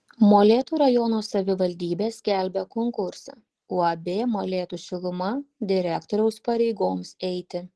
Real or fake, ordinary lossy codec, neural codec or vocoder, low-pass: real; Opus, 16 kbps; none; 10.8 kHz